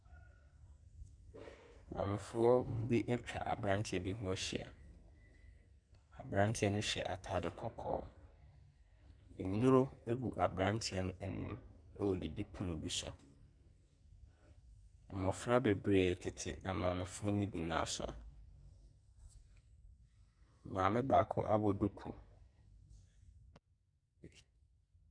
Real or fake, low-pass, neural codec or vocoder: fake; 9.9 kHz; codec, 44.1 kHz, 2.6 kbps, SNAC